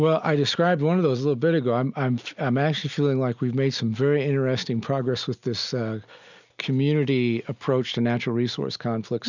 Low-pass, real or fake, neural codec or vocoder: 7.2 kHz; real; none